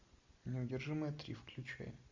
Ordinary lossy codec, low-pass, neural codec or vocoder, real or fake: MP3, 64 kbps; 7.2 kHz; none; real